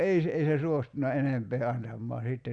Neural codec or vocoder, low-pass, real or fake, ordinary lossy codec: none; 9.9 kHz; real; Opus, 64 kbps